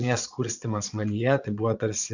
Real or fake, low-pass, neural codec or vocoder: fake; 7.2 kHz; vocoder, 22.05 kHz, 80 mel bands, Vocos